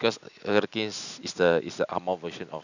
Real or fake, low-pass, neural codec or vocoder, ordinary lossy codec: real; 7.2 kHz; none; none